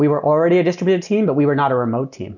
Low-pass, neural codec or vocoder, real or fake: 7.2 kHz; none; real